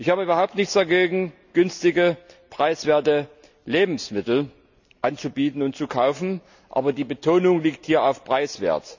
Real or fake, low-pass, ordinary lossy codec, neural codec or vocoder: real; 7.2 kHz; none; none